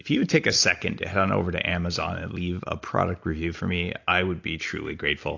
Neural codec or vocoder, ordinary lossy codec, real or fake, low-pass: none; AAC, 48 kbps; real; 7.2 kHz